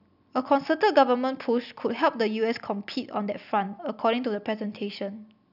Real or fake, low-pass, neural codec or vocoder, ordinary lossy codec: real; 5.4 kHz; none; none